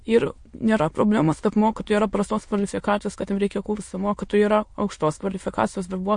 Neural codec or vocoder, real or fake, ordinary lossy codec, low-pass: autoencoder, 22.05 kHz, a latent of 192 numbers a frame, VITS, trained on many speakers; fake; MP3, 48 kbps; 9.9 kHz